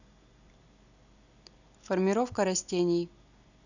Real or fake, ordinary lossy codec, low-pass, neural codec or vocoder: real; none; 7.2 kHz; none